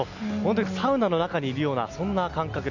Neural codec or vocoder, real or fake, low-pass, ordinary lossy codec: none; real; 7.2 kHz; none